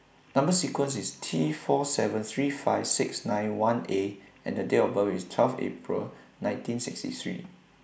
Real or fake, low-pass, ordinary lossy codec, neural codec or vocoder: real; none; none; none